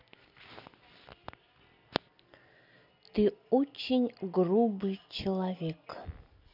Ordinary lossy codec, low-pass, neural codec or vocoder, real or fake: none; 5.4 kHz; none; real